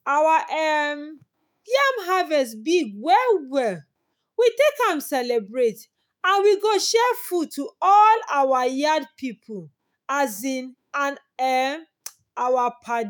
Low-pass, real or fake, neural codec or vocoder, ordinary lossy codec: none; fake; autoencoder, 48 kHz, 128 numbers a frame, DAC-VAE, trained on Japanese speech; none